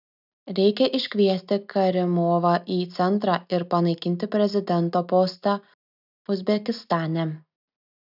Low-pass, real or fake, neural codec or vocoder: 5.4 kHz; real; none